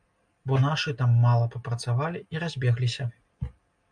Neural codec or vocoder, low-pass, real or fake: none; 9.9 kHz; real